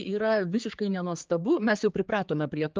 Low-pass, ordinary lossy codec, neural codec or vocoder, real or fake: 7.2 kHz; Opus, 24 kbps; codec, 16 kHz, 4 kbps, X-Codec, HuBERT features, trained on general audio; fake